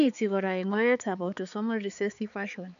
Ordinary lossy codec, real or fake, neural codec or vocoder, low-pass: none; fake; codec, 16 kHz, 4 kbps, X-Codec, HuBERT features, trained on balanced general audio; 7.2 kHz